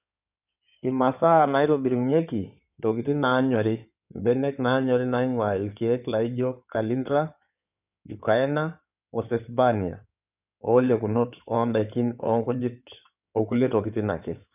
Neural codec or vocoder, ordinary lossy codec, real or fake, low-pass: codec, 16 kHz in and 24 kHz out, 2.2 kbps, FireRedTTS-2 codec; none; fake; 3.6 kHz